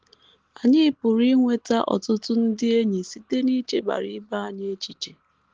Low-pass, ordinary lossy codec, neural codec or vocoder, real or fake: 7.2 kHz; Opus, 16 kbps; none; real